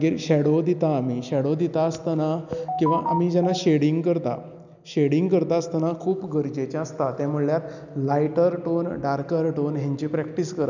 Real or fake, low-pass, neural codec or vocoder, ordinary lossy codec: real; 7.2 kHz; none; none